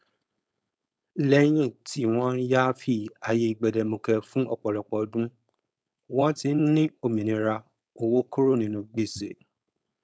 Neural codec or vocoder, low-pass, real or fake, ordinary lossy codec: codec, 16 kHz, 4.8 kbps, FACodec; none; fake; none